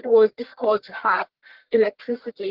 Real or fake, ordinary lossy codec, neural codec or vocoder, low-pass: fake; Opus, 16 kbps; codec, 44.1 kHz, 1.7 kbps, Pupu-Codec; 5.4 kHz